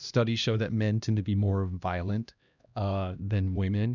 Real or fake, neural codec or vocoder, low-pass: fake; codec, 16 kHz, 1 kbps, X-Codec, HuBERT features, trained on LibriSpeech; 7.2 kHz